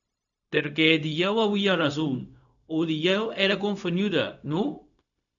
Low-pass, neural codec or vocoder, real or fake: 7.2 kHz; codec, 16 kHz, 0.4 kbps, LongCat-Audio-Codec; fake